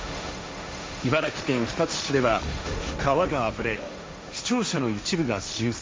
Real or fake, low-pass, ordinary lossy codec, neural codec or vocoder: fake; none; none; codec, 16 kHz, 1.1 kbps, Voila-Tokenizer